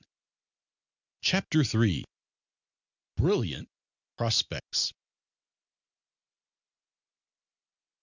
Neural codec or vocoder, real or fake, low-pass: none; real; 7.2 kHz